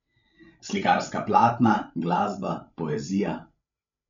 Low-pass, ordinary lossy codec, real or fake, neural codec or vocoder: 7.2 kHz; MP3, 64 kbps; fake; codec, 16 kHz, 16 kbps, FreqCodec, larger model